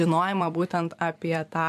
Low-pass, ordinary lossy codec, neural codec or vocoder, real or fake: 14.4 kHz; MP3, 96 kbps; vocoder, 44.1 kHz, 128 mel bands every 256 samples, BigVGAN v2; fake